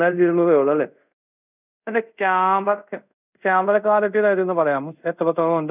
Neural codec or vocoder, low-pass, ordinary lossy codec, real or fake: codec, 24 kHz, 0.5 kbps, DualCodec; 3.6 kHz; none; fake